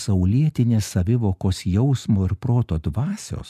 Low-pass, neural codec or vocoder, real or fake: 14.4 kHz; none; real